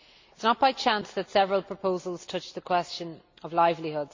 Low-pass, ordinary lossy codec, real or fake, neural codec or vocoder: 7.2 kHz; MP3, 48 kbps; real; none